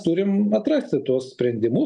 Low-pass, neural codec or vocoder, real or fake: 10.8 kHz; none; real